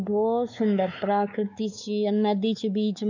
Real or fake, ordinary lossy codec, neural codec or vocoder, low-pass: fake; none; codec, 16 kHz, 4 kbps, X-Codec, WavLM features, trained on Multilingual LibriSpeech; 7.2 kHz